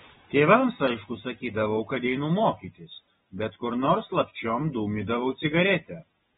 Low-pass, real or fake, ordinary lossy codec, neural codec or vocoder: 7.2 kHz; real; AAC, 16 kbps; none